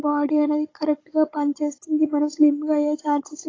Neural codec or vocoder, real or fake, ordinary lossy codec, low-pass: codec, 16 kHz, 16 kbps, FunCodec, trained on Chinese and English, 50 frames a second; fake; AAC, 32 kbps; 7.2 kHz